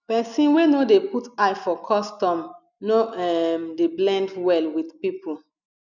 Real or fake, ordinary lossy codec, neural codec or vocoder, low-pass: real; none; none; 7.2 kHz